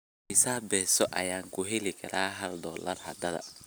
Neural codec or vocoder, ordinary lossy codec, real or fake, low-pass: none; none; real; none